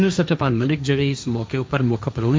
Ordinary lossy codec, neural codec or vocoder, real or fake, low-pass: none; codec, 16 kHz, 1.1 kbps, Voila-Tokenizer; fake; none